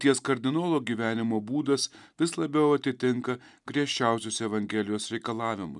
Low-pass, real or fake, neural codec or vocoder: 10.8 kHz; real; none